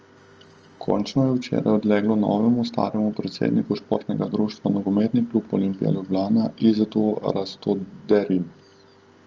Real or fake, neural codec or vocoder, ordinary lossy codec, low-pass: real; none; Opus, 24 kbps; 7.2 kHz